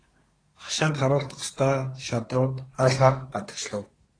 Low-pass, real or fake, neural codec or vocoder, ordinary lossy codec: 9.9 kHz; fake; codec, 24 kHz, 1 kbps, SNAC; AAC, 32 kbps